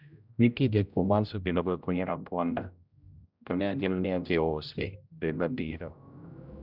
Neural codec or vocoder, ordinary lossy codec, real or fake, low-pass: codec, 16 kHz, 0.5 kbps, X-Codec, HuBERT features, trained on general audio; none; fake; 5.4 kHz